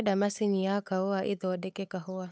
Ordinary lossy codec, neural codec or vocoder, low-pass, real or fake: none; codec, 16 kHz, 8 kbps, FunCodec, trained on Chinese and English, 25 frames a second; none; fake